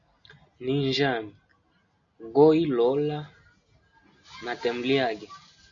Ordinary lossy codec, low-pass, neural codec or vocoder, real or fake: AAC, 64 kbps; 7.2 kHz; none; real